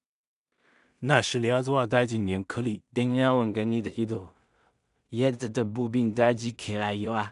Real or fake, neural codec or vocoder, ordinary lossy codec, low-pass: fake; codec, 16 kHz in and 24 kHz out, 0.4 kbps, LongCat-Audio-Codec, two codebook decoder; none; 10.8 kHz